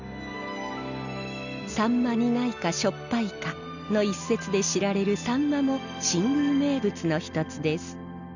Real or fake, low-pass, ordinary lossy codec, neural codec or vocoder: real; 7.2 kHz; none; none